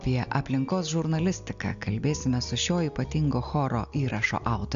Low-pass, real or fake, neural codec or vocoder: 7.2 kHz; real; none